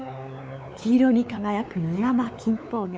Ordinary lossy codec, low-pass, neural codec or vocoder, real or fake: none; none; codec, 16 kHz, 4 kbps, X-Codec, WavLM features, trained on Multilingual LibriSpeech; fake